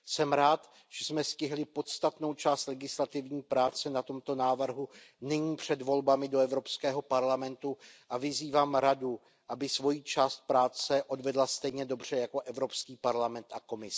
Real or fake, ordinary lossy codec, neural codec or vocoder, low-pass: real; none; none; none